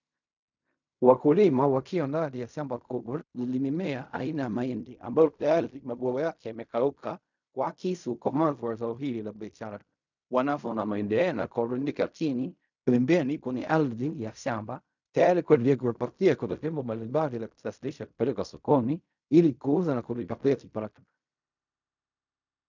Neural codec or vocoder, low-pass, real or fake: codec, 16 kHz in and 24 kHz out, 0.4 kbps, LongCat-Audio-Codec, fine tuned four codebook decoder; 7.2 kHz; fake